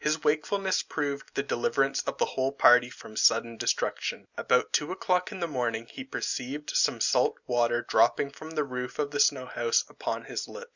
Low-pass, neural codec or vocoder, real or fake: 7.2 kHz; none; real